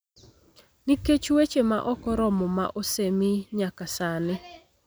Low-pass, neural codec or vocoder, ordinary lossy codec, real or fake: none; none; none; real